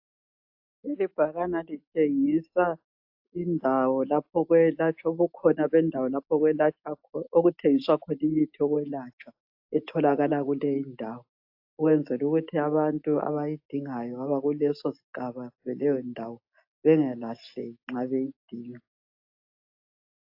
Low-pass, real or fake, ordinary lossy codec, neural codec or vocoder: 5.4 kHz; real; AAC, 48 kbps; none